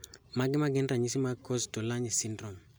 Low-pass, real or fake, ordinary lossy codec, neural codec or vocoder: none; real; none; none